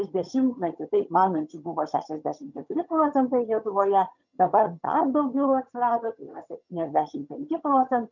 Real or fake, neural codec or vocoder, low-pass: fake; vocoder, 22.05 kHz, 80 mel bands, HiFi-GAN; 7.2 kHz